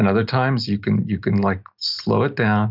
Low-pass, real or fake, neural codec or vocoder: 5.4 kHz; real; none